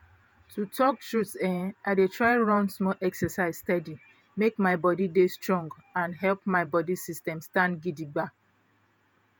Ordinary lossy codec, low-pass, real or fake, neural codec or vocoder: none; none; fake; vocoder, 48 kHz, 128 mel bands, Vocos